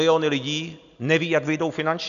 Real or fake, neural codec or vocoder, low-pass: real; none; 7.2 kHz